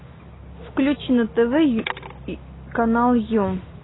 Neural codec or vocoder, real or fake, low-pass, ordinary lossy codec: none; real; 7.2 kHz; AAC, 16 kbps